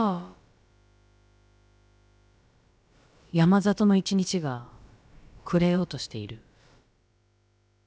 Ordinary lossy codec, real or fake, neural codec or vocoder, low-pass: none; fake; codec, 16 kHz, about 1 kbps, DyCAST, with the encoder's durations; none